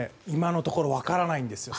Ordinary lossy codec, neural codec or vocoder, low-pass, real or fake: none; none; none; real